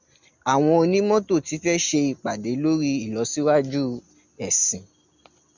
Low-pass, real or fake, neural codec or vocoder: 7.2 kHz; real; none